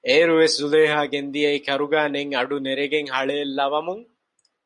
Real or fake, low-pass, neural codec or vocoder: real; 10.8 kHz; none